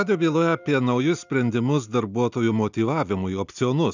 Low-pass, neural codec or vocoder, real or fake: 7.2 kHz; none; real